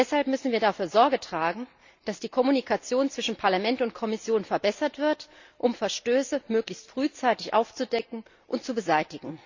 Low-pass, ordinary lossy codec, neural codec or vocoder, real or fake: 7.2 kHz; Opus, 64 kbps; none; real